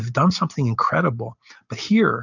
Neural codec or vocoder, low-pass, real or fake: none; 7.2 kHz; real